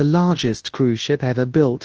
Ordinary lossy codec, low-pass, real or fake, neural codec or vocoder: Opus, 16 kbps; 7.2 kHz; fake; codec, 24 kHz, 0.9 kbps, WavTokenizer, large speech release